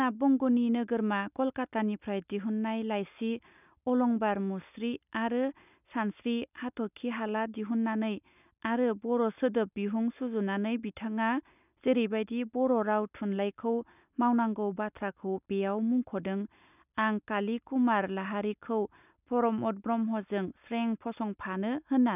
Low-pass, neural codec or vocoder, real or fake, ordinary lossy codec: 3.6 kHz; none; real; none